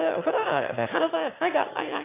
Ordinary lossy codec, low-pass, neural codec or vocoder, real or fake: AAC, 24 kbps; 3.6 kHz; autoencoder, 22.05 kHz, a latent of 192 numbers a frame, VITS, trained on one speaker; fake